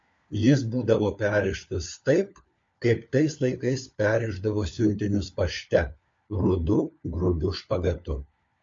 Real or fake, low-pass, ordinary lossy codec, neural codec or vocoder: fake; 7.2 kHz; MP3, 48 kbps; codec, 16 kHz, 4 kbps, FunCodec, trained on LibriTTS, 50 frames a second